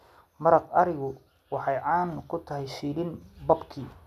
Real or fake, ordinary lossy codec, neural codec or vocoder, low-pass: real; none; none; 14.4 kHz